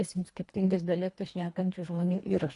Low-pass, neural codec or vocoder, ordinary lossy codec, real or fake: 10.8 kHz; codec, 24 kHz, 1.5 kbps, HILCodec; AAC, 48 kbps; fake